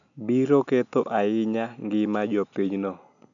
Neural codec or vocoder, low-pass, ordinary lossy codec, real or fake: none; 7.2 kHz; none; real